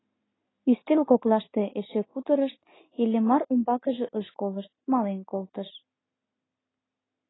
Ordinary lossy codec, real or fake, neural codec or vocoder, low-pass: AAC, 16 kbps; real; none; 7.2 kHz